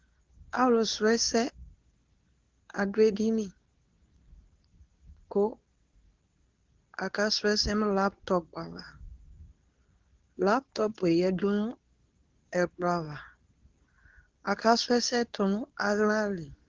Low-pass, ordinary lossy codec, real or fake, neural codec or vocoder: 7.2 kHz; Opus, 16 kbps; fake; codec, 24 kHz, 0.9 kbps, WavTokenizer, medium speech release version 2